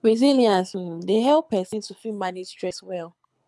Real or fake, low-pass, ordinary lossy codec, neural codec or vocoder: fake; none; none; codec, 24 kHz, 6 kbps, HILCodec